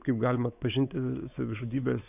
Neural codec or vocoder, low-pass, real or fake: codec, 16 kHz, 4 kbps, X-Codec, WavLM features, trained on Multilingual LibriSpeech; 3.6 kHz; fake